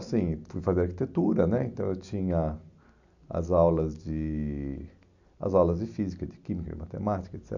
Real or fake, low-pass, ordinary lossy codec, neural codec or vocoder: real; 7.2 kHz; none; none